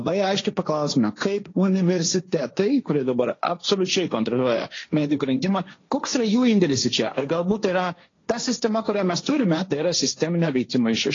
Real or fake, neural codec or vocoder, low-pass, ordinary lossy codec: fake; codec, 16 kHz, 1.1 kbps, Voila-Tokenizer; 7.2 kHz; AAC, 32 kbps